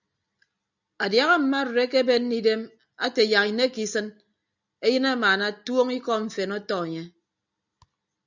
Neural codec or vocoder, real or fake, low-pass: none; real; 7.2 kHz